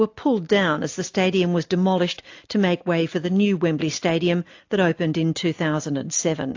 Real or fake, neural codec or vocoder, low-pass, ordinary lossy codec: real; none; 7.2 kHz; AAC, 48 kbps